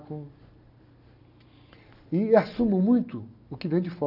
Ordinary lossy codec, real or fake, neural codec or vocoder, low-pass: none; real; none; 5.4 kHz